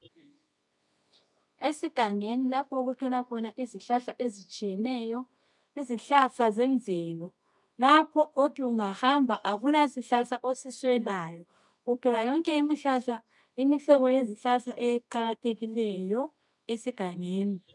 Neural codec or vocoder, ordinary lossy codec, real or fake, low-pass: codec, 24 kHz, 0.9 kbps, WavTokenizer, medium music audio release; MP3, 96 kbps; fake; 10.8 kHz